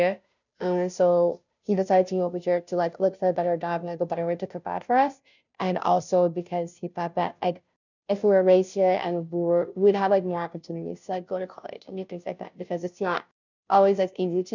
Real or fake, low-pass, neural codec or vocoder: fake; 7.2 kHz; codec, 16 kHz, 0.5 kbps, FunCodec, trained on Chinese and English, 25 frames a second